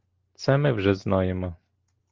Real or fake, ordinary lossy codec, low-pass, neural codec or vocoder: real; Opus, 16 kbps; 7.2 kHz; none